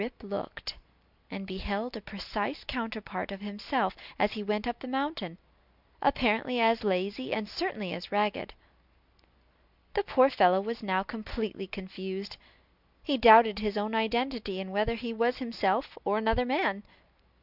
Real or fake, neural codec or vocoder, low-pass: real; none; 5.4 kHz